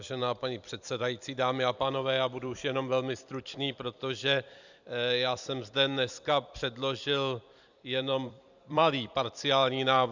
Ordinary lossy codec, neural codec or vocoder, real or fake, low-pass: Opus, 64 kbps; vocoder, 44.1 kHz, 128 mel bands every 256 samples, BigVGAN v2; fake; 7.2 kHz